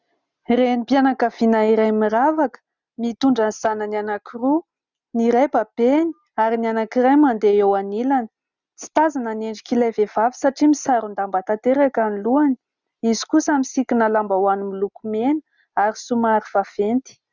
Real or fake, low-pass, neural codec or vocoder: real; 7.2 kHz; none